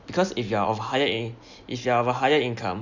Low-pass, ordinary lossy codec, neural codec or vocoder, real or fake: 7.2 kHz; none; none; real